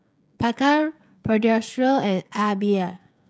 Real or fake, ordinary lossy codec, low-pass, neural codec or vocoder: fake; none; none; codec, 16 kHz, 16 kbps, FreqCodec, smaller model